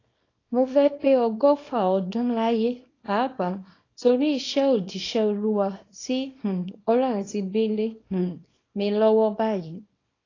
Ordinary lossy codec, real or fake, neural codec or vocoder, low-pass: AAC, 32 kbps; fake; codec, 24 kHz, 0.9 kbps, WavTokenizer, small release; 7.2 kHz